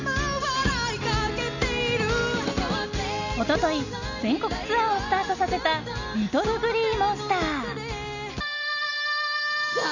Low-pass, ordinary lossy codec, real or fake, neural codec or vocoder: 7.2 kHz; none; real; none